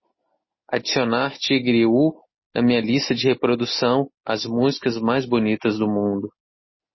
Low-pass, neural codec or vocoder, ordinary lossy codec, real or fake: 7.2 kHz; none; MP3, 24 kbps; real